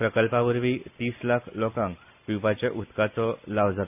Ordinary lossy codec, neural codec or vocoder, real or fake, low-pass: none; none; real; 3.6 kHz